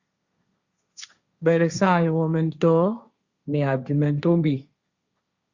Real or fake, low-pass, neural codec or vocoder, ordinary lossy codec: fake; 7.2 kHz; codec, 16 kHz, 1.1 kbps, Voila-Tokenizer; Opus, 64 kbps